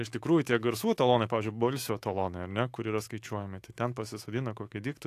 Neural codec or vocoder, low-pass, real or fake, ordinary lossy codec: autoencoder, 48 kHz, 128 numbers a frame, DAC-VAE, trained on Japanese speech; 14.4 kHz; fake; AAC, 64 kbps